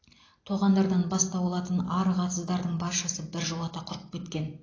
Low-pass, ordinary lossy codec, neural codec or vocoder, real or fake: 7.2 kHz; AAC, 32 kbps; none; real